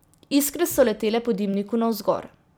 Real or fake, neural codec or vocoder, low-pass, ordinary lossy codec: fake; vocoder, 44.1 kHz, 128 mel bands every 256 samples, BigVGAN v2; none; none